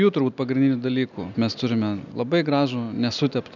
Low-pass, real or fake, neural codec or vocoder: 7.2 kHz; real; none